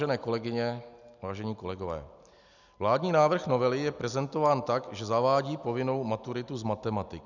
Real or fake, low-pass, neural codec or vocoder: real; 7.2 kHz; none